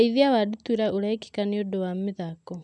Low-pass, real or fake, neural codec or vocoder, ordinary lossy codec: 10.8 kHz; real; none; none